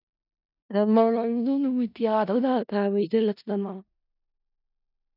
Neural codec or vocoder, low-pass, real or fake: codec, 16 kHz in and 24 kHz out, 0.4 kbps, LongCat-Audio-Codec, four codebook decoder; 5.4 kHz; fake